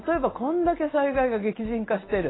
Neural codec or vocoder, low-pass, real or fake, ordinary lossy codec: none; 7.2 kHz; real; AAC, 16 kbps